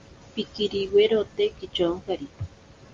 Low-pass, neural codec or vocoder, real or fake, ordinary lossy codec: 7.2 kHz; none; real; Opus, 32 kbps